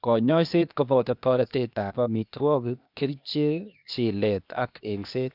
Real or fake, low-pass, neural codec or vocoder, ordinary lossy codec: fake; 5.4 kHz; codec, 16 kHz, 0.8 kbps, ZipCodec; none